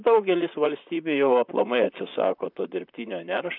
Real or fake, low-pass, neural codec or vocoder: fake; 5.4 kHz; vocoder, 44.1 kHz, 128 mel bands, Pupu-Vocoder